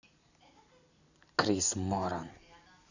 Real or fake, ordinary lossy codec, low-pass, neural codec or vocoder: real; none; 7.2 kHz; none